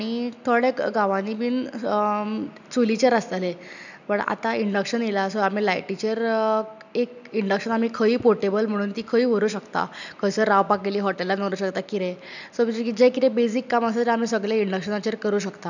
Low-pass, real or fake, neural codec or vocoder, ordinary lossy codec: 7.2 kHz; real; none; none